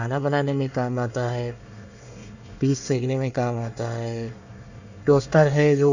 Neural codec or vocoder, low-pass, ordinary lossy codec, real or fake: codec, 24 kHz, 1 kbps, SNAC; 7.2 kHz; none; fake